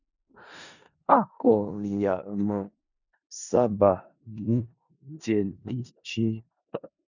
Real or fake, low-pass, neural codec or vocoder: fake; 7.2 kHz; codec, 16 kHz in and 24 kHz out, 0.4 kbps, LongCat-Audio-Codec, four codebook decoder